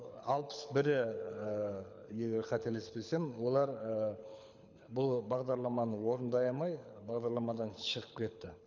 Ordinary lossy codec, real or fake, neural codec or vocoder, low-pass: none; fake; codec, 24 kHz, 6 kbps, HILCodec; 7.2 kHz